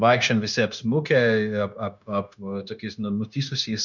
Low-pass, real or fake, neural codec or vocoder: 7.2 kHz; fake; codec, 16 kHz, 0.9 kbps, LongCat-Audio-Codec